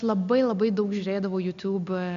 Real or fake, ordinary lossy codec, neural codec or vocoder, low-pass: real; MP3, 96 kbps; none; 7.2 kHz